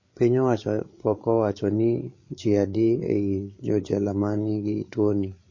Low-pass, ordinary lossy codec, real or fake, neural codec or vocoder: 7.2 kHz; MP3, 32 kbps; fake; codec, 16 kHz, 8 kbps, FreqCodec, larger model